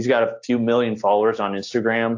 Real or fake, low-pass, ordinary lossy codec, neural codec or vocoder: real; 7.2 kHz; AAC, 48 kbps; none